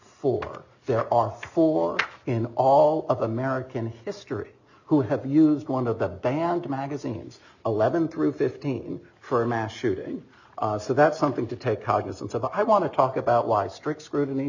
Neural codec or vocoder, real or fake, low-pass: none; real; 7.2 kHz